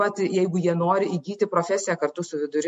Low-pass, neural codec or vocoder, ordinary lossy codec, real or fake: 14.4 kHz; none; MP3, 48 kbps; real